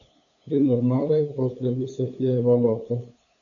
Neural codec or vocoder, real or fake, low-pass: codec, 16 kHz, 8 kbps, FunCodec, trained on LibriTTS, 25 frames a second; fake; 7.2 kHz